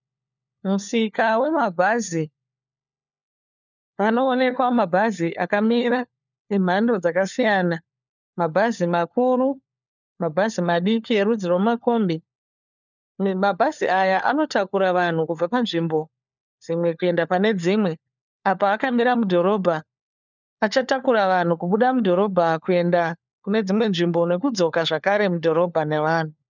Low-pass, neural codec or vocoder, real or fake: 7.2 kHz; codec, 16 kHz, 4 kbps, FunCodec, trained on LibriTTS, 50 frames a second; fake